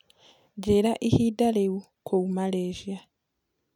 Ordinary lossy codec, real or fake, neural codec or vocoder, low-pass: none; real; none; 19.8 kHz